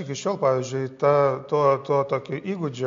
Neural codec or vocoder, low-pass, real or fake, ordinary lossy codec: none; 7.2 kHz; real; MP3, 48 kbps